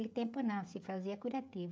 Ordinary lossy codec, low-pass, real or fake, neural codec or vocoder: none; none; fake; codec, 16 kHz, 16 kbps, FreqCodec, smaller model